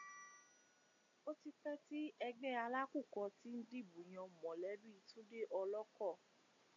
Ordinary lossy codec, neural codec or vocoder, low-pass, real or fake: AAC, 48 kbps; none; 7.2 kHz; real